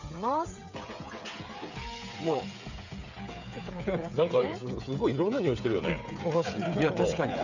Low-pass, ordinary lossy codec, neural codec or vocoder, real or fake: 7.2 kHz; none; codec, 16 kHz, 16 kbps, FreqCodec, smaller model; fake